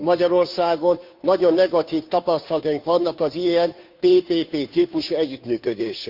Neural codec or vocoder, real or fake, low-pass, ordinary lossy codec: codec, 16 kHz, 2 kbps, FunCodec, trained on Chinese and English, 25 frames a second; fake; 5.4 kHz; none